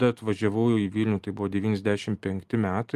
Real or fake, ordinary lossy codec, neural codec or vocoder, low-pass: real; Opus, 32 kbps; none; 14.4 kHz